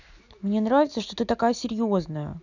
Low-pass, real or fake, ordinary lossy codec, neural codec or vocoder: 7.2 kHz; real; none; none